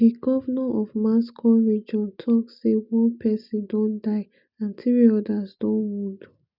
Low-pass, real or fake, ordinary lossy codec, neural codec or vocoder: 5.4 kHz; real; none; none